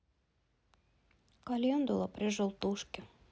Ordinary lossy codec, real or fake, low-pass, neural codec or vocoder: none; real; none; none